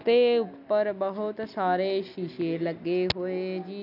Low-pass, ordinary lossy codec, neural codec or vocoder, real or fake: 5.4 kHz; none; none; real